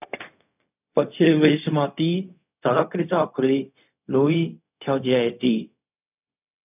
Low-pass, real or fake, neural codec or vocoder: 3.6 kHz; fake; codec, 16 kHz, 0.4 kbps, LongCat-Audio-Codec